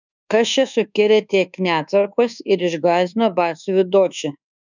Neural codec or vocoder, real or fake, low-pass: codec, 24 kHz, 1.2 kbps, DualCodec; fake; 7.2 kHz